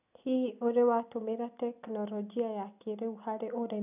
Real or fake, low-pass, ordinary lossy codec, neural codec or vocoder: real; 3.6 kHz; none; none